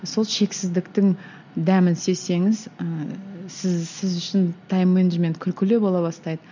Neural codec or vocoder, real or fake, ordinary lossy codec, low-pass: none; real; none; 7.2 kHz